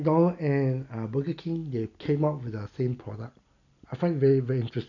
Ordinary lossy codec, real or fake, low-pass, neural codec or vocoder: none; real; 7.2 kHz; none